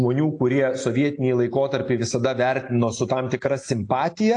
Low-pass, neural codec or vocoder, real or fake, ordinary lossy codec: 9.9 kHz; none; real; AAC, 48 kbps